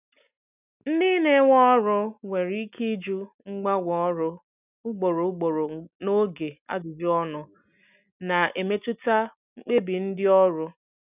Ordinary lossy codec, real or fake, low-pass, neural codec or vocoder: none; real; 3.6 kHz; none